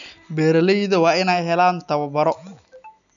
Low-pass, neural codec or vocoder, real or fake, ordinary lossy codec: 7.2 kHz; none; real; none